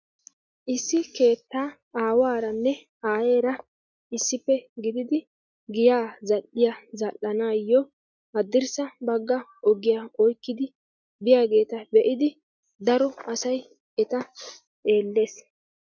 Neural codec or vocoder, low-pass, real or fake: none; 7.2 kHz; real